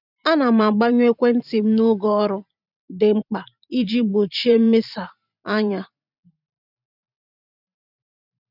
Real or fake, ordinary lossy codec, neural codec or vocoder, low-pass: fake; none; vocoder, 44.1 kHz, 128 mel bands every 512 samples, BigVGAN v2; 5.4 kHz